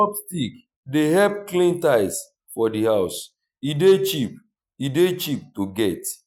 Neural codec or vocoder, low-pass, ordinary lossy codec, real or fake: none; 19.8 kHz; none; real